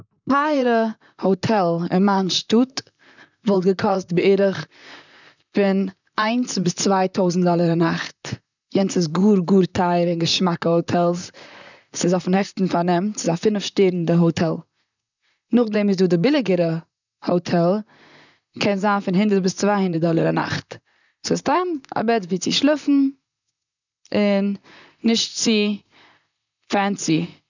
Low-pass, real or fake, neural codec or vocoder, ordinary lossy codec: 7.2 kHz; fake; vocoder, 44.1 kHz, 128 mel bands, Pupu-Vocoder; none